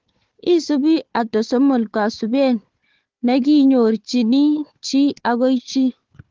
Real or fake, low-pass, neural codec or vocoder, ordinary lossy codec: fake; 7.2 kHz; codec, 16 kHz, 4 kbps, FunCodec, trained on Chinese and English, 50 frames a second; Opus, 16 kbps